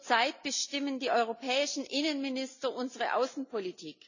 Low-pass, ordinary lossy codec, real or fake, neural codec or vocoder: 7.2 kHz; none; real; none